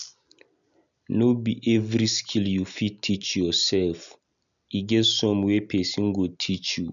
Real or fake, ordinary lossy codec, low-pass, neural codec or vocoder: real; none; 7.2 kHz; none